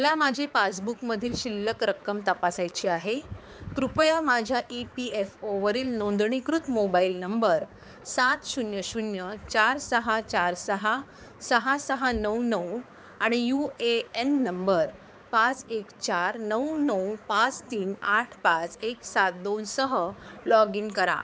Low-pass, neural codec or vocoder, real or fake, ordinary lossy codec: none; codec, 16 kHz, 4 kbps, X-Codec, HuBERT features, trained on balanced general audio; fake; none